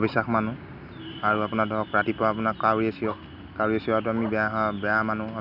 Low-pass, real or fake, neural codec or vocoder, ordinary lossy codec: 5.4 kHz; real; none; none